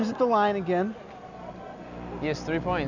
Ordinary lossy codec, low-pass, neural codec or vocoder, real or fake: Opus, 64 kbps; 7.2 kHz; none; real